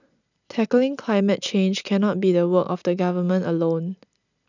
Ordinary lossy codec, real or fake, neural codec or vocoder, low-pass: none; real; none; 7.2 kHz